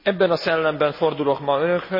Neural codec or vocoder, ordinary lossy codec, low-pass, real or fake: none; MP3, 24 kbps; 5.4 kHz; real